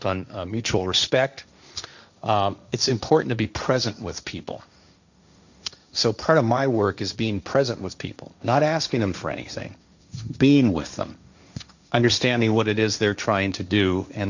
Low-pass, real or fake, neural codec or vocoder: 7.2 kHz; fake; codec, 16 kHz, 1.1 kbps, Voila-Tokenizer